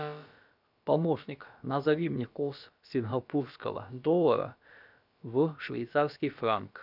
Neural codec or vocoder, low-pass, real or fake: codec, 16 kHz, about 1 kbps, DyCAST, with the encoder's durations; 5.4 kHz; fake